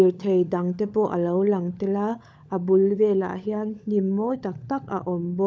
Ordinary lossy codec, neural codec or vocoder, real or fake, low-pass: none; codec, 16 kHz, 16 kbps, FunCodec, trained on LibriTTS, 50 frames a second; fake; none